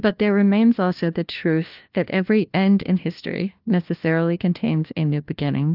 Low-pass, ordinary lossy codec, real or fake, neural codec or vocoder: 5.4 kHz; Opus, 24 kbps; fake; codec, 16 kHz, 1 kbps, FunCodec, trained on LibriTTS, 50 frames a second